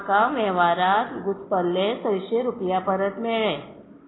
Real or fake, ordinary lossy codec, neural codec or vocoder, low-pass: real; AAC, 16 kbps; none; 7.2 kHz